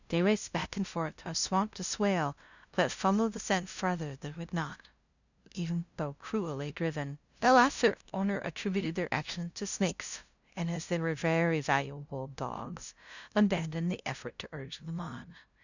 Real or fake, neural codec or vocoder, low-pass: fake; codec, 16 kHz, 0.5 kbps, FunCodec, trained on LibriTTS, 25 frames a second; 7.2 kHz